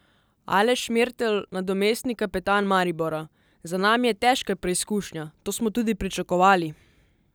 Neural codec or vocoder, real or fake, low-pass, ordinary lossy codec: none; real; none; none